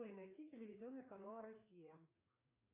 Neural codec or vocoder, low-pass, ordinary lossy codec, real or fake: codec, 16 kHz, 2 kbps, FreqCodec, larger model; 3.6 kHz; AAC, 32 kbps; fake